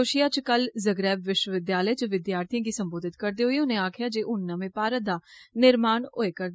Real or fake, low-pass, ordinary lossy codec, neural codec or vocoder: real; none; none; none